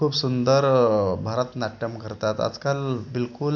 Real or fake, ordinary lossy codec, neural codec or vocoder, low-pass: real; none; none; 7.2 kHz